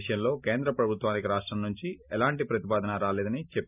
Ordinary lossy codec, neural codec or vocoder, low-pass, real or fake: none; none; 3.6 kHz; real